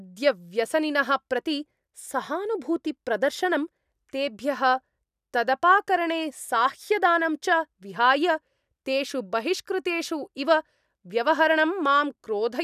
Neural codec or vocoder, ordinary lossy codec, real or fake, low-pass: none; none; real; 14.4 kHz